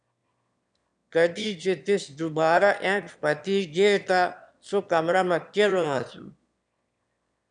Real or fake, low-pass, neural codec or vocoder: fake; 9.9 kHz; autoencoder, 22.05 kHz, a latent of 192 numbers a frame, VITS, trained on one speaker